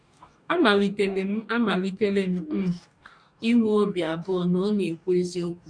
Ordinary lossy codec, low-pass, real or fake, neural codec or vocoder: none; 9.9 kHz; fake; codec, 44.1 kHz, 2.6 kbps, DAC